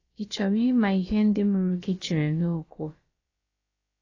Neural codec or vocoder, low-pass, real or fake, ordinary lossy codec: codec, 16 kHz, about 1 kbps, DyCAST, with the encoder's durations; 7.2 kHz; fake; AAC, 32 kbps